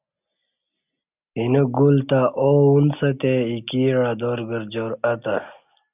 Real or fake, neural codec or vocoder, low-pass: real; none; 3.6 kHz